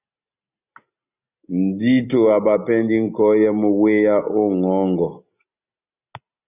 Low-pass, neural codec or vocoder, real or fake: 3.6 kHz; none; real